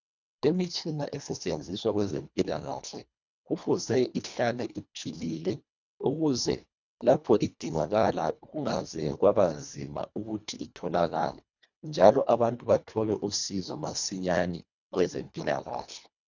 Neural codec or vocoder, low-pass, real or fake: codec, 24 kHz, 1.5 kbps, HILCodec; 7.2 kHz; fake